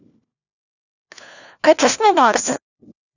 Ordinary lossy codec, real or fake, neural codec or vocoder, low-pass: none; fake; codec, 16 kHz, 1 kbps, FunCodec, trained on LibriTTS, 50 frames a second; 7.2 kHz